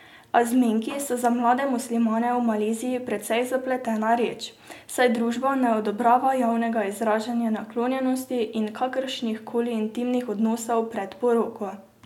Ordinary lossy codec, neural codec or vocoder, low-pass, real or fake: MP3, 96 kbps; none; 19.8 kHz; real